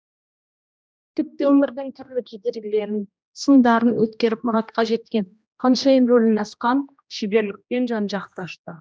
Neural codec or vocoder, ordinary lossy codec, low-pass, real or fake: codec, 16 kHz, 1 kbps, X-Codec, HuBERT features, trained on general audio; none; none; fake